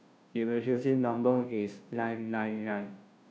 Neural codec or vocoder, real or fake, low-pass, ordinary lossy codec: codec, 16 kHz, 0.5 kbps, FunCodec, trained on Chinese and English, 25 frames a second; fake; none; none